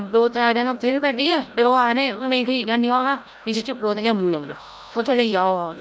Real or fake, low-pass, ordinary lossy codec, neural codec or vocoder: fake; none; none; codec, 16 kHz, 0.5 kbps, FreqCodec, larger model